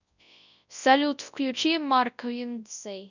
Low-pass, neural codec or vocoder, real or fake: 7.2 kHz; codec, 24 kHz, 0.9 kbps, WavTokenizer, large speech release; fake